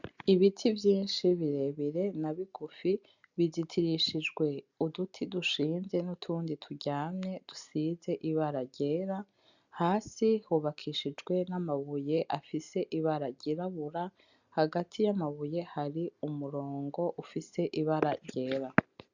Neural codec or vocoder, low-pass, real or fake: none; 7.2 kHz; real